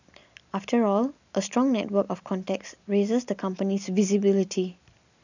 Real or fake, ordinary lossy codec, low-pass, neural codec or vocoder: real; none; 7.2 kHz; none